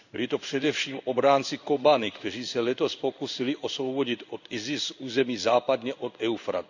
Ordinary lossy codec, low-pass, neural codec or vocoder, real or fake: none; 7.2 kHz; codec, 16 kHz in and 24 kHz out, 1 kbps, XY-Tokenizer; fake